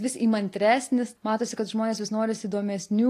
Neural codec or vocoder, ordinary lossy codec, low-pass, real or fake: none; AAC, 48 kbps; 14.4 kHz; real